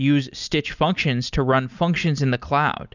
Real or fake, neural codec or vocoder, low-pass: real; none; 7.2 kHz